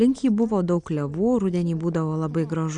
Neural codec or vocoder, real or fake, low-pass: none; real; 9.9 kHz